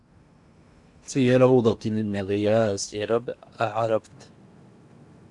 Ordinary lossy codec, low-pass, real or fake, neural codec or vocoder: Opus, 64 kbps; 10.8 kHz; fake; codec, 16 kHz in and 24 kHz out, 0.8 kbps, FocalCodec, streaming, 65536 codes